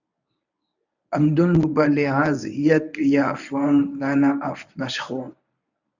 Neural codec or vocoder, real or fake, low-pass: codec, 24 kHz, 0.9 kbps, WavTokenizer, medium speech release version 1; fake; 7.2 kHz